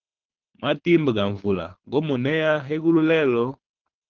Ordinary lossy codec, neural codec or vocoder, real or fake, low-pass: Opus, 16 kbps; codec, 24 kHz, 6 kbps, HILCodec; fake; 7.2 kHz